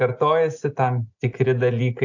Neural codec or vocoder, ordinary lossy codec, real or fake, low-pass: none; AAC, 48 kbps; real; 7.2 kHz